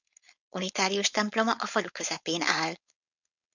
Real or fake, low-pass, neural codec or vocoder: fake; 7.2 kHz; codec, 16 kHz, 4.8 kbps, FACodec